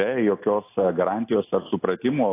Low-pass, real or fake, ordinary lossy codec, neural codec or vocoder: 3.6 kHz; real; AAC, 24 kbps; none